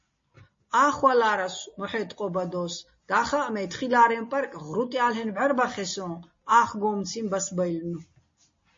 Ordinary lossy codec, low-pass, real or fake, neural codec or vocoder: MP3, 32 kbps; 7.2 kHz; real; none